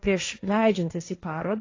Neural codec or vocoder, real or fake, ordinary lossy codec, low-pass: codec, 16 kHz, 1.1 kbps, Voila-Tokenizer; fake; AAC, 32 kbps; 7.2 kHz